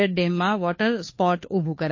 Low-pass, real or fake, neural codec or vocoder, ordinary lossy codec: 7.2 kHz; fake; codec, 16 kHz, 2 kbps, FunCodec, trained on Chinese and English, 25 frames a second; MP3, 32 kbps